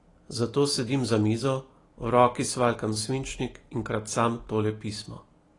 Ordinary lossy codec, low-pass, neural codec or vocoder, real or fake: AAC, 32 kbps; 10.8 kHz; none; real